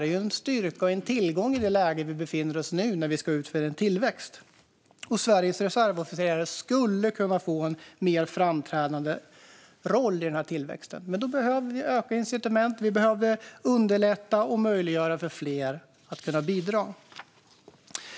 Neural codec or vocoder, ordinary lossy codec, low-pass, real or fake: none; none; none; real